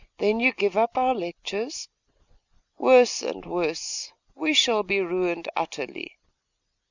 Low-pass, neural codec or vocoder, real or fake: 7.2 kHz; none; real